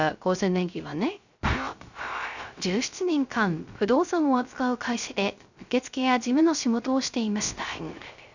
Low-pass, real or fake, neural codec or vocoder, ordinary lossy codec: 7.2 kHz; fake; codec, 16 kHz, 0.3 kbps, FocalCodec; none